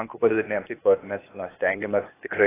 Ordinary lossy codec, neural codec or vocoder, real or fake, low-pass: AAC, 16 kbps; codec, 16 kHz, 0.8 kbps, ZipCodec; fake; 3.6 kHz